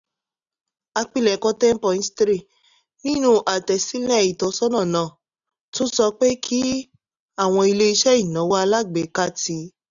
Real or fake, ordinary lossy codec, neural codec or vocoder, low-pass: real; none; none; 7.2 kHz